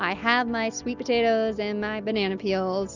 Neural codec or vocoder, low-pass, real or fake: none; 7.2 kHz; real